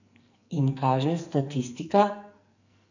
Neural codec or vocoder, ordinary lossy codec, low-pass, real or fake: codec, 44.1 kHz, 2.6 kbps, SNAC; none; 7.2 kHz; fake